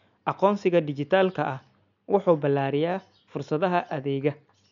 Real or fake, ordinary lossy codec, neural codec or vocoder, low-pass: real; none; none; 7.2 kHz